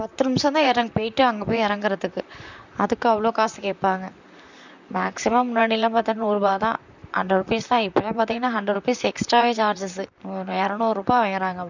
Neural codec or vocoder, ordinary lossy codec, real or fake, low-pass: vocoder, 44.1 kHz, 128 mel bands, Pupu-Vocoder; none; fake; 7.2 kHz